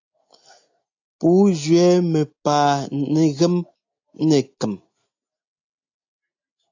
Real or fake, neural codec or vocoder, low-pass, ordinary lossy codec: real; none; 7.2 kHz; AAC, 48 kbps